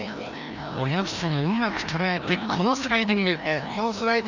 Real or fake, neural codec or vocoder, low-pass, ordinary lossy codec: fake; codec, 16 kHz, 1 kbps, FreqCodec, larger model; 7.2 kHz; none